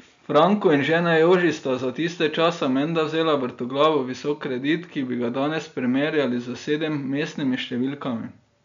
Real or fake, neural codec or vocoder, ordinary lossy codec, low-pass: real; none; MP3, 64 kbps; 7.2 kHz